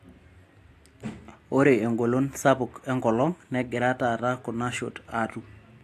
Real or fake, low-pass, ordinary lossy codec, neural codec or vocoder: real; 14.4 kHz; AAC, 64 kbps; none